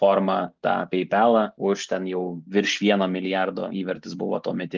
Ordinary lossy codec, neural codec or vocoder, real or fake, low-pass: Opus, 32 kbps; none; real; 7.2 kHz